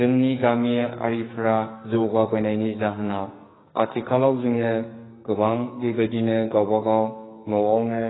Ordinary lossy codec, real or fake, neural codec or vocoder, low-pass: AAC, 16 kbps; fake; codec, 44.1 kHz, 2.6 kbps, SNAC; 7.2 kHz